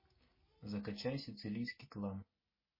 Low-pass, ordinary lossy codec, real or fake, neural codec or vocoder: 5.4 kHz; MP3, 24 kbps; real; none